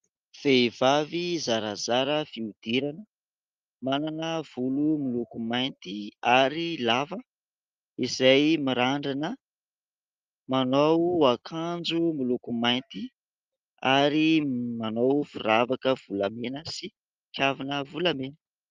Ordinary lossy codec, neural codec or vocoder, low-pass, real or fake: Opus, 32 kbps; none; 7.2 kHz; real